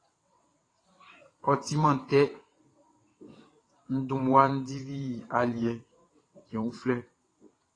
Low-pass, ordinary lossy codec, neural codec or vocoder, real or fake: 9.9 kHz; AAC, 32 kbps; vocoder, 22.05 kHz, 80 mel bands, WaveNeXt; fake